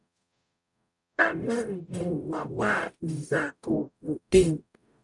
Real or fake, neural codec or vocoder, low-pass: fake; codec, 44.1 kHz, 0.9 kbps, DAC; 10.8 kHz